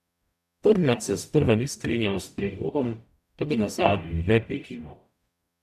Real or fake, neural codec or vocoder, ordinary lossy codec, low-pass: fake; codec, 44.1 kHz, 0.9 kbps, DAC; none; 14.4 kHz